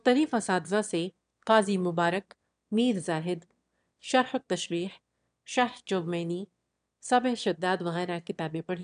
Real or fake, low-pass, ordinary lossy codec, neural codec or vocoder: fake; 9.9 kHz; none; autoencoder, 22.05 kHz, a latent of 192 numbers a frame, VITS, trained on one speaker